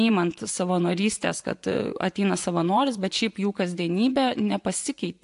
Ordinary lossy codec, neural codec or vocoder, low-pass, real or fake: AAC, 64 kbps; vocoder, 24 kHz, 100 mel bands, Vocos; 10.8 kHz; fake